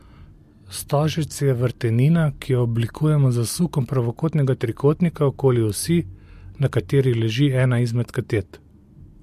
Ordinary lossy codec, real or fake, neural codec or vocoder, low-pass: MP3, 64 kbps; real; none; 14.4 kHz